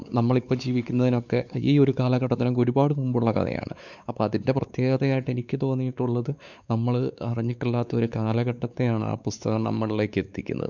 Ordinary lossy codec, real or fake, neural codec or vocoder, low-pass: none; fake; codec, 16 kHz, 4 kbps, X-Codec, WavLM features, trained on Multilingual LibriSpeech; 7.2 kHz